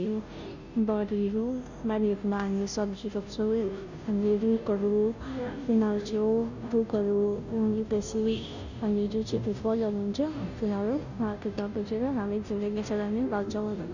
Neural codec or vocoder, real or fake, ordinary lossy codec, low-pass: codec, 16 kHz, 0.5 kbps, FunCodec, trained on Chinese and English, 25 frames a second; fake; none; 7.2 kHz